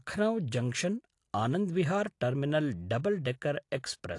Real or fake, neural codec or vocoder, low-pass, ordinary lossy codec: real; none; 10.8 kHz; AAC, 48 kbps